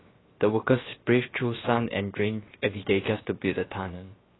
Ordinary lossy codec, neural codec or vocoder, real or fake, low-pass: AAC, 16 kbps; codec, 16 kHz, about 1 kbps, DyCAST, with the encoder's durations; fake; 7.2 kHz